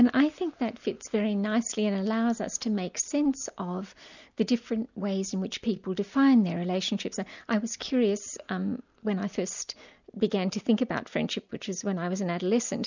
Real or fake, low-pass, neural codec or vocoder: real; 7.2 kHz; none